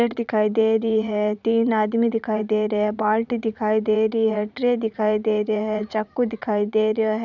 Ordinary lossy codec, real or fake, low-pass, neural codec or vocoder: none; fake; 7.2 kHz; vocoder, 44.1 kHz, 80 mel bands, Vocos